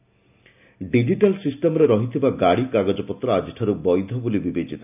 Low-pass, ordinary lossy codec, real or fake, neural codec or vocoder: 3.6 kHz; none; real; none